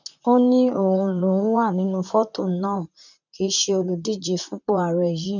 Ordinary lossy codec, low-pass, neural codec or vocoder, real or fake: none; 7.2 kHz; vocoder, 44.1 kHz, 128 mel bands, Pupu-Vocoder; fake